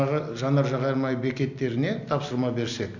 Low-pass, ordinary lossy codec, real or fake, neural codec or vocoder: 7.2 kHz; none; real; none